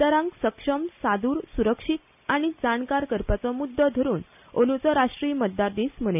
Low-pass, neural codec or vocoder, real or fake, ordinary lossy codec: 3.6 kHz; none; real; none